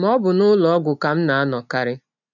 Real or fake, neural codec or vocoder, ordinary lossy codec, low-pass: real; none; none; 7.2 kHz